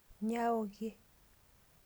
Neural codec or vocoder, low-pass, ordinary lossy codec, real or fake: none; none; none; real